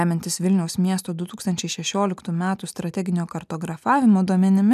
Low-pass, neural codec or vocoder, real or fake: 14.4 kHz; none; real